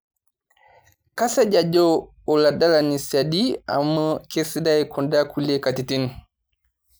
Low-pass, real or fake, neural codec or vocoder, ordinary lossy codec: none; real; none; none